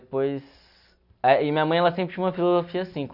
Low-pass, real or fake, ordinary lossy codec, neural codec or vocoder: 5.4 kHz; real; none; none